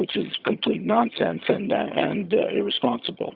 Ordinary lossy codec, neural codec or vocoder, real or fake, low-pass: Opus, 64 kbps; vocoder, 22.05 kHz, 80 mel bands, HiFi-GAN; fake; 5.4 kHz